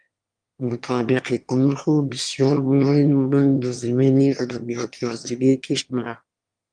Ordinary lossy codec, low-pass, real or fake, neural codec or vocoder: Opus, 32 kbps; 9.9 kHz; fake; autoencoder, 22.05 kHz, a latent of 192 numbers a frame, VITS, trained on one speaker